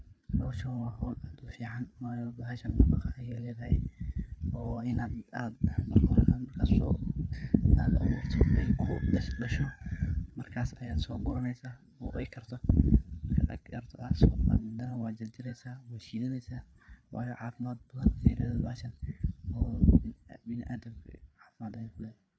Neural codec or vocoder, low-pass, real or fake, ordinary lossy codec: codec, 16 kHz, 8 kbps, FreqCodec, larger model; none; fake; none